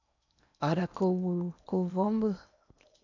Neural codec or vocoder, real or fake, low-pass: codec, 16 kHz in and 24 kHz out, 0.8 kbps, FocalCodec, streaming, 65536 codes; fake; 7.2 kHz